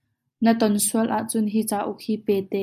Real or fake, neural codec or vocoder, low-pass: real; none; 14.4 kHz